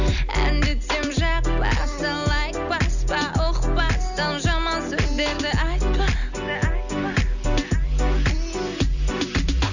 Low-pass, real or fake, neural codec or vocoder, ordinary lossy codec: 7.2 kHz; real; none; none